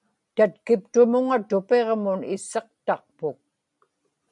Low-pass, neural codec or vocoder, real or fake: 10.8 kHz; none; real